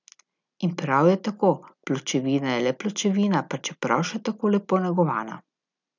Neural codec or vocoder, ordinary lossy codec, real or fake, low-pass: none; none; real; 7.2 kHz